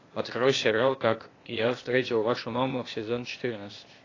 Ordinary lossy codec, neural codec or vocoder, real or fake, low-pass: AAC, 32 kbps; codec, 16 kHz, 0.8 kbps, ZipCodec; fake; 7.2 kHz